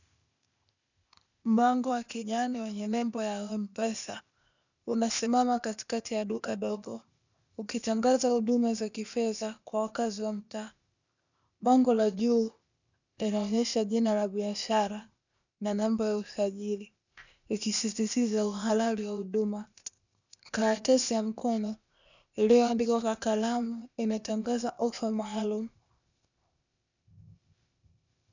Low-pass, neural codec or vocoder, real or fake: 7.2 kHz; codec, 16 kHz, 0.8 kbps, ZipCodec; fake